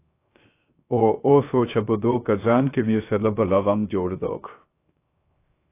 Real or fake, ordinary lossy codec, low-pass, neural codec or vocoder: fake; AAC, 24 kbps; 3.6 kHz; codec, 16 kHz, 0.3 kbps, FocalCodec